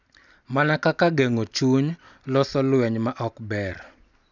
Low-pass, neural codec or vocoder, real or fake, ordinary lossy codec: 7.2 kHz; none; real; none